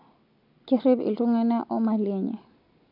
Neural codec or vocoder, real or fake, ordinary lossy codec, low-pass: none; real; AAC, 48 kbps; 5.4 kHz